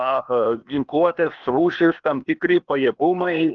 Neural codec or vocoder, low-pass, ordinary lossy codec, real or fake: codec, 16 kHz, 0.8 kbps, ZipCodec; 7.2 kHz; Opus, 32 kbps; fake